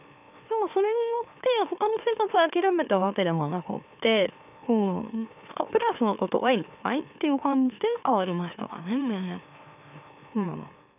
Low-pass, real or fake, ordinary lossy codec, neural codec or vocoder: 3.6 kHz; fake; none; autoencoder, 44.1 kHz, a latent of 192 numbers a frame, MeloTTS